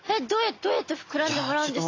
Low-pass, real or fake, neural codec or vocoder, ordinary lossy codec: 7.2 kHz; real; none; AAC, 32 kbps